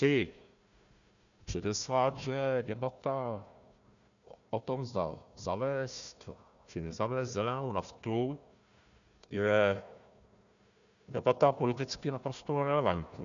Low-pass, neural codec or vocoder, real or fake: 7.2 kHz; codec, 16 kHz, 1 kbps, FunCodec, trained on Chinese and English, 50 frames a second; fake